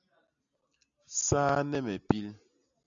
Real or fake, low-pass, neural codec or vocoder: real; 7.2 kHz; none